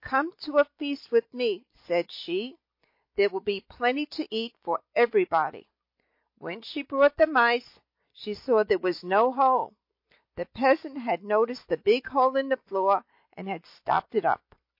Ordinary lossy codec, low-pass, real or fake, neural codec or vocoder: MP3, 32 kbps; 5.4 kHz; real; none